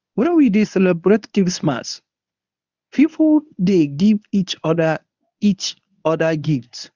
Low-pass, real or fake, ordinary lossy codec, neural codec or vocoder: 7.2 kHz; fake; none; codec, 24 kHz, 0.9 kbps, WavTokenizer, medium speech release version 1